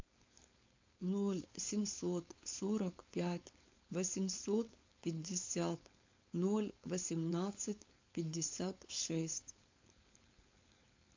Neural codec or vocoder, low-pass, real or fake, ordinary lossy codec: codec, 16 kHz, 4.8 kbps, FACodec; 7.2 kHz; fake; MP3, 64 kbps